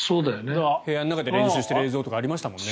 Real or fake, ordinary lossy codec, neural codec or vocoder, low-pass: real; none; none; none